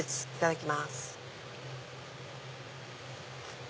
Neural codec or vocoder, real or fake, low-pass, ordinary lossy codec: none; real; none; none